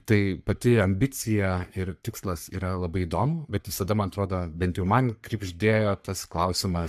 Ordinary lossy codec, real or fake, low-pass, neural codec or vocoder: AAC, 96 kbps; fake; 14.4 kHz; codec, 44.1 kHz, 3.4 kbps, Pupu-Codec